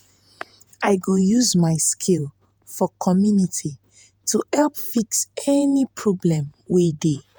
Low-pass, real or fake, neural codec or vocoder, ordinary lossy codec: none; fake; vocoder, 48 kHz, 128 mel bands, Vocos; none